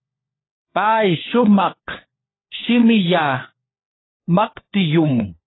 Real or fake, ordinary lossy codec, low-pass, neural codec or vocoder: fake; AAC, 16 kbps; 7.2 kHz; codec, 16 kHz, 4 kbps, FunCodec, trained on LibriTTS, 50 frames a second